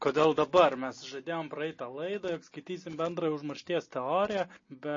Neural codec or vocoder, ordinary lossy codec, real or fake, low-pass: none; MP3, 32 kbps; real; 7.2 kHz